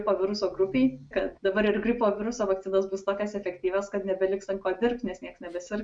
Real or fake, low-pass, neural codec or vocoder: real; 9.9 kHz; none